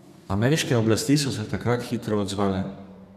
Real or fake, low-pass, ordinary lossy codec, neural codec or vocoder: fake; 14.4 kHz; none; codec, 32 kHz, 1.9 kbps, SNAC